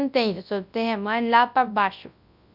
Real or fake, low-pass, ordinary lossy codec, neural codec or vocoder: fake; 5.4 kHz; none; codec, 24 kHz, 0.9 kbps, WavTokenizer, large speech release